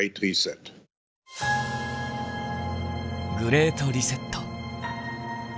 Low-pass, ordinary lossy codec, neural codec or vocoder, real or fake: none; none; none; real